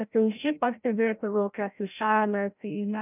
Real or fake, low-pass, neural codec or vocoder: fake; 3.6 kHz; codec, 16 kHz, 0.5 kbps, FreqCodec, larger model